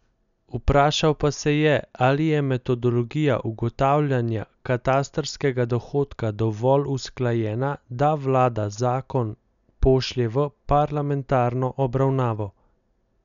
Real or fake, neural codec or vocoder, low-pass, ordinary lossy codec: real; none; 7.2 kHz; none